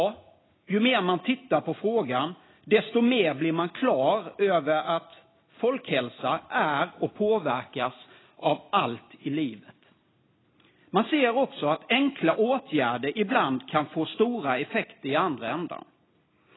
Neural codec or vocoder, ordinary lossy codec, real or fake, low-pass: none; AAC, 16 kbps; real; 7.2 kHz